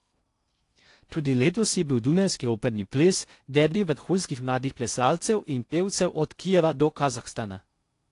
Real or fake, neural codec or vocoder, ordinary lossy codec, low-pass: fake; codec, 16 kHz in and 24 kHz out, 0.6 kbps, FocalCodec, streaming, 2048 codes; AAC, 48 kbps; 10.8 kHz